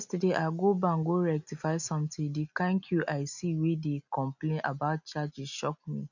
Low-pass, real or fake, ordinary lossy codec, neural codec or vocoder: 7.2 kHz; real; none; none